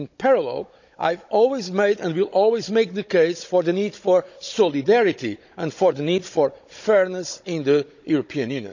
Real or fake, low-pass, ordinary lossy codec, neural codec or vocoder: fake; 7.2 kHz; none; codec, 16 kHz, 16 kbps, FunCodec, trained on Chinese and English, 50 frames a second